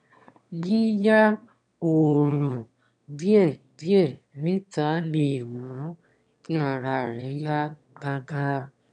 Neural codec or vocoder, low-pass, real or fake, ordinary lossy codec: autoencoder, 22.05 kHz, a latent of 192 numbers a frame, VITS, trained on one speaker; 9.9 kHz; fake; MP3, 96 kbps